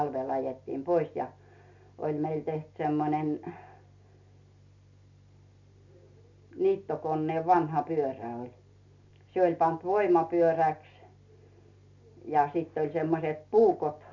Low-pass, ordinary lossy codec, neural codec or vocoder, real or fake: 7.2 kHz; MP3, 64 kbps; none; real